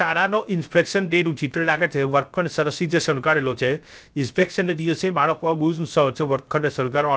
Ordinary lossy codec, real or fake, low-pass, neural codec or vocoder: none; fake; none; codec, 16 kHz, 0.3 kbps, FocalCodec